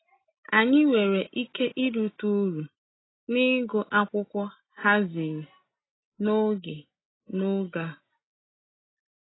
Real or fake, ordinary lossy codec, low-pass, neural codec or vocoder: real; AAC, 16 kbps; 7.2 kHz; none